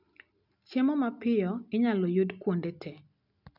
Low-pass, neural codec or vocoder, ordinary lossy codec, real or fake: 5.4 kHz; none; none; real